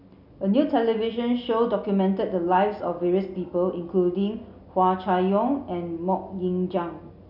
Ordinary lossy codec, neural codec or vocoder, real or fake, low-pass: none; none; real; 5.4 kHz